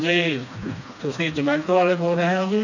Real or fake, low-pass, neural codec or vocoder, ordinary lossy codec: fake; 7.2 kHz; codec, 16 kHz, 2 kbps, FreqCodec, smaller model; none